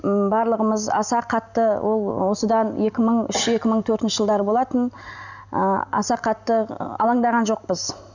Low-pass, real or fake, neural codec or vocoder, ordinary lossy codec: 7.2 kHz; real; none; none